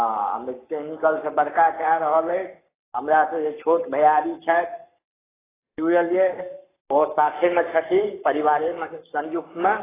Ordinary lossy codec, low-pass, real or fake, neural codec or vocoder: AAC, 16 kbps; 3.6 kHz; fake; codec, 44.1 kHz, 7.8 kbps, Pupu-Codec